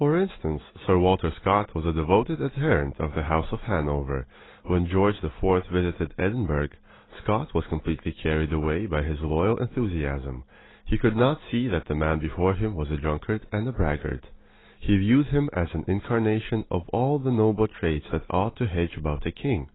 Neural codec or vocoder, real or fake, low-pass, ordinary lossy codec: none; real; 7.2 kHz; AAC, 16 kbps